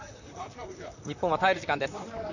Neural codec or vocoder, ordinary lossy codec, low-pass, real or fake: vocoder, 44.1 kHz, 128 mel bands, Pupu-Vocoder; none; 7.2 kHz; fake